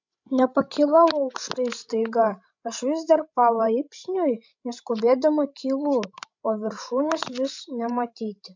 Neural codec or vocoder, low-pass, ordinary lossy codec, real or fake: codec, 16 kHz, 16 kbps, FreqCodec, larger model; 7.2 kHz; MP3, 64 kbps; fake